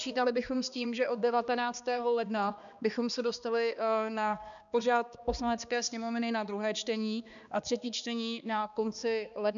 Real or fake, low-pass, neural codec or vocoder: fake; 7.2 kHz; codec, 16 kHz, 2 kbps, X-Codec, HuBERT features, trained on balanced general audio